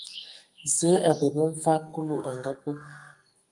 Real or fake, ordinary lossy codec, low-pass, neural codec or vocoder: fake; Opus, 32 kbps; 10.8 kHz; codec, 44.1 kHz, 2.6 kbps, SNAC